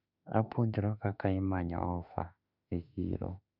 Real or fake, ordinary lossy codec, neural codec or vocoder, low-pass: fake; none; autoencoder, 48 kHz, 32 numbers a frame, DAC-VAE, trained on Japanese speech; 5.4 kHz